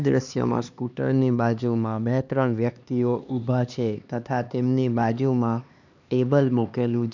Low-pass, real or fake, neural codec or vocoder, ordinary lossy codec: 7.2 kHz; fake; codec, 16 kHz, 2 kbps, X-Codec, HuBERT features, trained on LibriSpeech; none